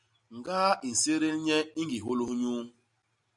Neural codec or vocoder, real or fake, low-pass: none; real; 10.8 kHz